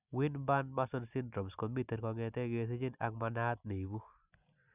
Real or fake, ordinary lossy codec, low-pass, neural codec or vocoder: real; none; 3.6 kHz; none